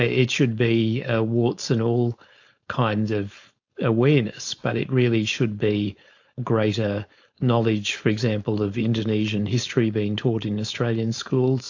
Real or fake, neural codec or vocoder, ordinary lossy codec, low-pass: fake; codec, 16 kHz, 4.8 kbps, FACodec; AAC, 48 kbps; 7.2 kHz